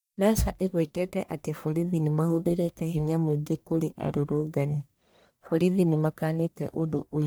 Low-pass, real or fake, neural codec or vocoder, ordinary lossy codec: none; fake; codec, 44.1 kHz, 1.7 kbps, Pupu-Codec; none